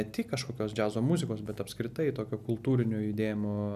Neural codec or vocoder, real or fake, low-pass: none; real; 14.4 kHz